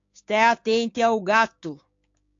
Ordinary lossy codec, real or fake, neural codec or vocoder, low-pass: AAC, 48 kbps; fake; codec, 16 kHz, 6 kbps, DAC; 7.2 kHz